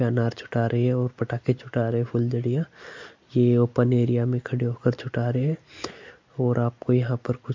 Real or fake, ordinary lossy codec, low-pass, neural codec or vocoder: real; MP3, 48 kbps; 7.2 kHz; none